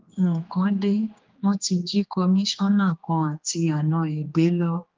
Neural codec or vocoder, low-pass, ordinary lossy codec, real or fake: codec, 16 kHz, 2 kbps, X-Codec, HuBERT features, trained on general audio; 7.2 kHz; Opus, 32 kbps; fake